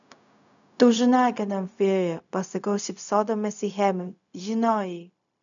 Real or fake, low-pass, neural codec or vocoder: fake; 7.2 kHz; codec, 16 kHz, 0.4 kbps, LongCat-Audio-Codec